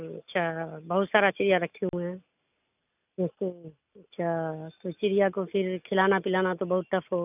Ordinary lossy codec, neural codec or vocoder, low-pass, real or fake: none; none; 3.6 kHz; real